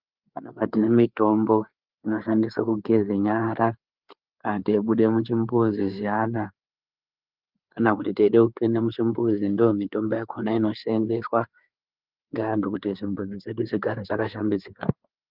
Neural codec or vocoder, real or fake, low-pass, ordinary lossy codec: codec, 16 kHz, 4 kbps, FreqCodec, larger model; fake; 5.4 kHz; Opus, 32 kbps